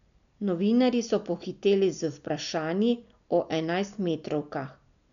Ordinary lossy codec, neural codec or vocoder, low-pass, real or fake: none; none; 7.2 kHz; real